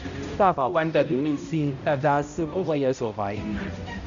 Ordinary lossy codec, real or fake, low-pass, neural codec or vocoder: Opus, 64 kbps; fake; 7.2 kHz; codec, 16 kHz, 0.5 kbps, X-Codec, HuBERT features, trained on balanced general audio